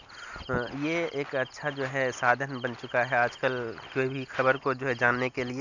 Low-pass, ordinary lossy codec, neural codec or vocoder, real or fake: 7.2 kHz; none; none; real